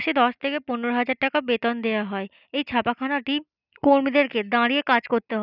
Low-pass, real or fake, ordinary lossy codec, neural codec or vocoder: 5.4 kHz; real; none; none